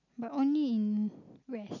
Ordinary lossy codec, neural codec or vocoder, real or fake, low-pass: none; none; real; 7.2 kHz